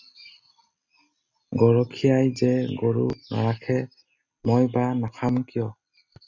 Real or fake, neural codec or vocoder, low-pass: real; none; 7.2 kHz